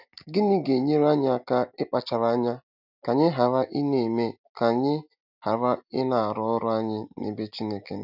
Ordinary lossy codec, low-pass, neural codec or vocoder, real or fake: none; 5.4 kHz; none; real